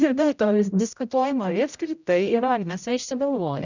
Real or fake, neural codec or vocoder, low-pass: fake; codec, 16 kHz, 0.5 kbps, X-Codec, HuBERT features, trained on general audio; 7.2 kHz